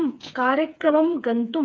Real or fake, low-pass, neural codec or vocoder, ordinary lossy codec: fake; none; codec, 16 kHz, 4 kbps, FreqCodec, smaller model; none